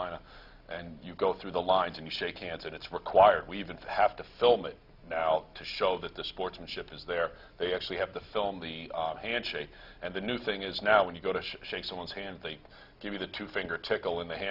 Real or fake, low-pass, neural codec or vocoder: real; 5.4 kHz; none